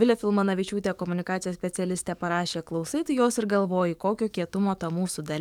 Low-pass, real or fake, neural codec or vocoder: 19.8 kHz; fake; codec, 44.1 kHz, 7.8 kbps, DAC